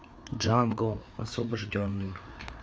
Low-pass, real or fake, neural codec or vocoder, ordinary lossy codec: none; fake; codec, 16 kHz, 4 kbps, FreqCodec, larger model; none